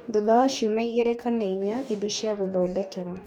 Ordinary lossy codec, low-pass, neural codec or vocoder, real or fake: none; 19.8 kHz; codec, 44.1 kHz, 2.6 kbps, DAC; fake